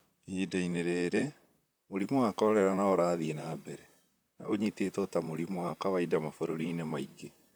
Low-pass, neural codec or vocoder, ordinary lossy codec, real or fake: none; vocoder, 44.1 kHz, 128 mel bands, Pupu-Vocoder; none; fake